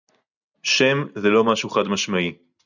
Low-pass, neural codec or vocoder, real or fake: 7.2 kHz; none; real